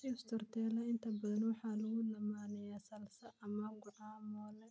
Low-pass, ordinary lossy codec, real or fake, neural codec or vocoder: none; none; real; none